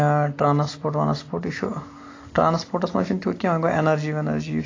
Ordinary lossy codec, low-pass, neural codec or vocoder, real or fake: AAC, 32 kbps; 7.2 kHz; none; real